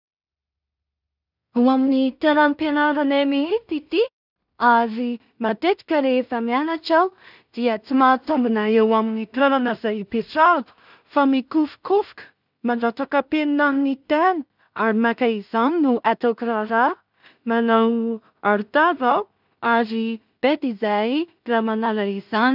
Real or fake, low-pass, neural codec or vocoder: fake; 5.4 kHz; codec, 16 kHz in and 24 kHz out, 0.4 kbps, LongCat-Audio-Codec, two codebook decoder